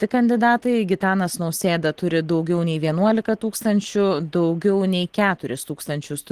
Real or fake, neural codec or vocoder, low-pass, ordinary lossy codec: real; none; 14.4 kHz; Opus, 16 kbps